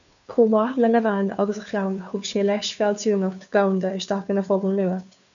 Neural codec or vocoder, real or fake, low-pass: codec, 16 kHz, 2 kbps, FunCodec, trained on Chinese and English, 25 frames a second; fake; 7.2 kHz